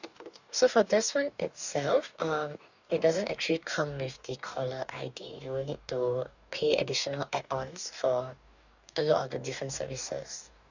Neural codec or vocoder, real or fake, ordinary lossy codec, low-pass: codec, 44.1 kHz, 2.6 kbps, DAC; fake; none; 7.2 kHz